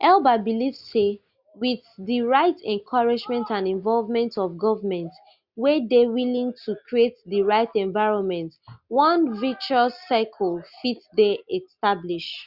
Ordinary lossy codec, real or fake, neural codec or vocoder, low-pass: Opus, 64 kbps; real; none; 5.4 kHz